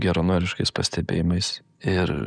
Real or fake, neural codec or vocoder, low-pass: real; none; 9.9 kHz